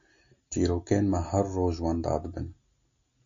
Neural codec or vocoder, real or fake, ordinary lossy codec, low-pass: none; real; MP3, 64 kbps; 7.2 kHz